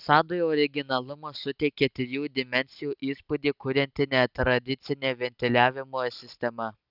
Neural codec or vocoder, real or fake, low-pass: none; real; 5.4 kHz